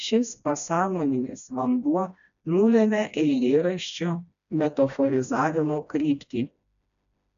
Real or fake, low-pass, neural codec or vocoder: fake; 7.2 kHz; codec, 16 kHz, 1 kbps, FreqCodec, smaller model